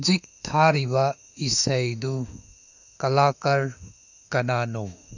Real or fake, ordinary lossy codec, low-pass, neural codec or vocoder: fake; none; 7.2 kHz; autoencoder, 48 kHz, 32 numbers a frame, DAC-VAE, trained on Japanese speech